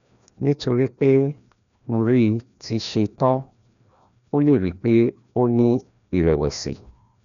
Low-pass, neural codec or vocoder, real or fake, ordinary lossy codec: 7.2 kHz; codec, 16 kHz, 1 kbps, FreqCodec, larger model; fake; none